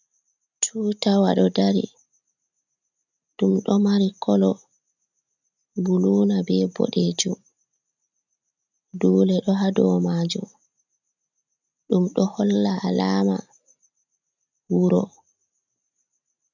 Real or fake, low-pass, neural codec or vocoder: real; 7.2 kHz; none